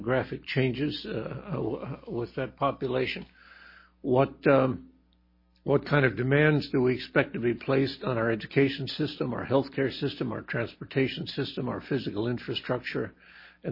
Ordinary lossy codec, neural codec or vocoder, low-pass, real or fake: MP3, 24 kbps; none; 5.4 kHz; real